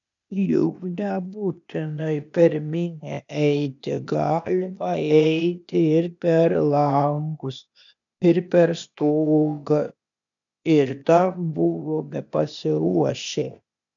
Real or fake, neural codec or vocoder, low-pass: fake; codec, 16 kHz, 0.8 kbps, ZipCodec; 7.2 kHz